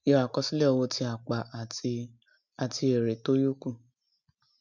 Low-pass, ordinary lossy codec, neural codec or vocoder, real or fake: 7.2 kHz; none; none; real